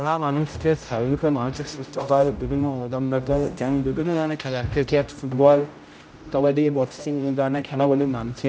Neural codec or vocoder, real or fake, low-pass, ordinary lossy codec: codec, 16 kHz, 0.5 kbps, X-Codec, HuBERT features, trained on general audio; fake; none; none